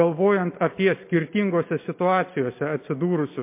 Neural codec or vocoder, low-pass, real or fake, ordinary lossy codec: none; 3.6 kHz; real; MP3, 24 kbps